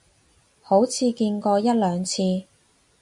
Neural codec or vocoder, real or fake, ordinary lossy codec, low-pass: none; real; MP3, 64 kbps; 10.8 kHz